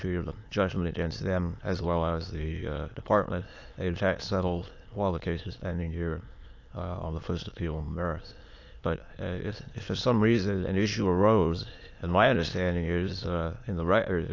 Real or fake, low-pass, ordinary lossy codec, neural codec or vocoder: fake; 7.2 kHz; AAC, 48 kbps; autoencoder, 22.05 kHz, a latent of 192 numbers a frame, VITS, trained on many speakers